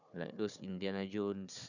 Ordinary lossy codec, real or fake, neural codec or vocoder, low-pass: none; fake; codec, 16 kHz, 4 kbps, FunCodec, trained on Chinese and English, 50 frames a second; 7.2 kHz